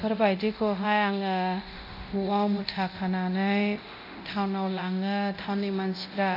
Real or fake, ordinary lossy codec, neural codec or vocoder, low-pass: fake; none; codec, 24 kHz, 0.9 kbps, DualCodec; 5.4 kHz